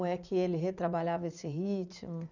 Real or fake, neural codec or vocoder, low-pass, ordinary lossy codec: fake; vocoder, 44.1 kHz, 80 mel bands, Vocos; 7.2 kHz; none